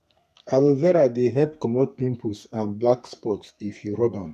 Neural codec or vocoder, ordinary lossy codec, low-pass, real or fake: codec, 32 kHz, 1.9 kbps, SNAC; none; 14.4 kHz; fake